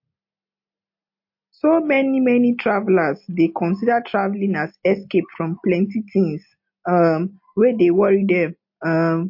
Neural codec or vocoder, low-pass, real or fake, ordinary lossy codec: none; 5.4 kHz; real; MP3, 32 kbps